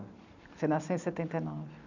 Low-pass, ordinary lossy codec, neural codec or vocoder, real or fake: 7.2 kHz; none; none; real